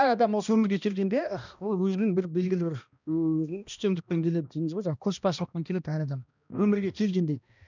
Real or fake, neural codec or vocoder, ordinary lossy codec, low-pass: fake; codec, 16 kHz, 1 kbps, X-Codec, HuBERT features, trained on balanced general audio; none; 7.2 kHz